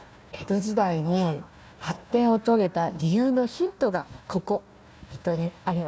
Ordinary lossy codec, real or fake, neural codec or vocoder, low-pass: none; fake; codec, 16 kHz, 1 kbps, FunCodec, trained on Chinese and English, 50 frames a second; none